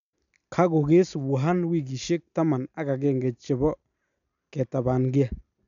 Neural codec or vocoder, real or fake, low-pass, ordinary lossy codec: none; real; 7.2 kHz; none